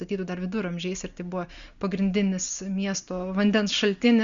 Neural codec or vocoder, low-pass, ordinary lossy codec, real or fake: none; 7.2 kHz; Opus, 64 kbps; real